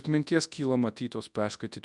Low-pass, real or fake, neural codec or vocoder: 10.8 kHz; fake; codec, 24 kHz, 0.9 kbps, WavTokenizer, large speech release